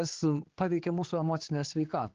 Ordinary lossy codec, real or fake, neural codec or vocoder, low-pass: Opus, 16 kbps; fake; codec, 16 kHz, 4 kbps, X-Codec, HuBERT features, trained on general audio; 7.2 kHz